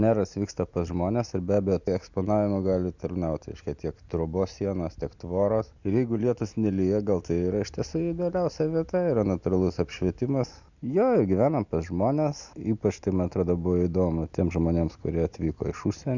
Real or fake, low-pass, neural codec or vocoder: real; 7.2 kHz; none